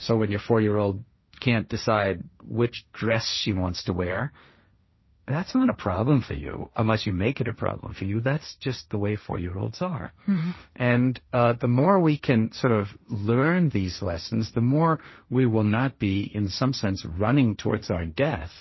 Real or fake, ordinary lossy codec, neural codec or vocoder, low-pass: fake; MP3, 24 kbps; codec, 16 kHz, 1.1 kbps, Voila-Tokenizer; 7.2 kHz